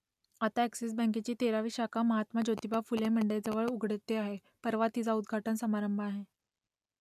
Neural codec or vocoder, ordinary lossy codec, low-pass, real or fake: none; none; 14.4 kHz; real